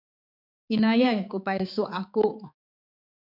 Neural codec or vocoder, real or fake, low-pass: codec, 16 kHz, 4 kbps, X-Codec, HuBERT features, trained on balanced general audio; fake; 5.4 kHz